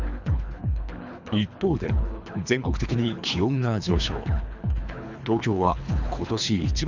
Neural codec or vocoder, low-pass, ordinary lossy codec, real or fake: codec, 24 kHz, 3 kbps, HILCodec; 7.2 kHz; none; fake